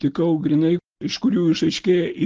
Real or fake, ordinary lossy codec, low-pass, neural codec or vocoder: real; Opus, 16 kbps; 7.2 kHz; none